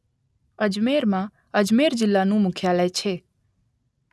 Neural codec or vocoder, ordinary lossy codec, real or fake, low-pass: none; none; real; none